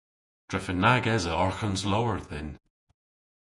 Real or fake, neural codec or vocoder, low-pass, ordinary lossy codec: fake; vocoder, 48 kHz, 128 mel bands, Vocos; 10.8 kHz; Opus, 64 kbps